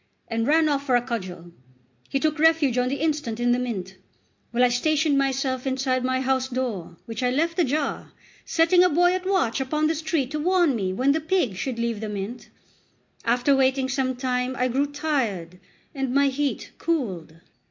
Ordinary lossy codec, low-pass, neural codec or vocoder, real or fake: MP3, 48 kbps; 7.2 kHz; none; real